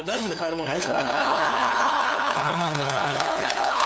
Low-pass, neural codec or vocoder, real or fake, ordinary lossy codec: none; codec, 16 kHz, 2 kbps, FunCodec, trained on LibriTTS, 25 frames a second; fake; none